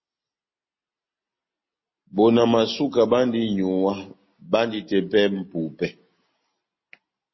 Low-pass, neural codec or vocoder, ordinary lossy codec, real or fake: 7.2 kHz; none; MP3, 24 kbps; real